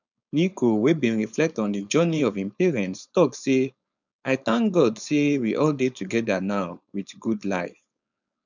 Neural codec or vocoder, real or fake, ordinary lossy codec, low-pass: codec, 16 kHz, 4.8 kbps, FACodec; fake; none; 7.2 kHz